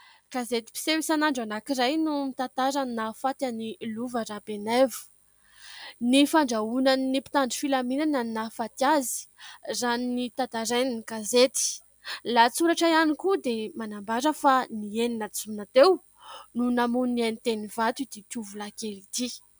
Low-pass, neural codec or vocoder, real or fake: 19.8 kHz; none; real